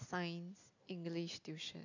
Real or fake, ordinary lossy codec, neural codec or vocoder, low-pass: real; none; none; 7.2 kHz